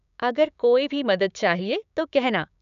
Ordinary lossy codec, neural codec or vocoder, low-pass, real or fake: MP3, 96 kbps; codec, 16 kHz, 6 kbps, DAC; 7.2 kHz; fake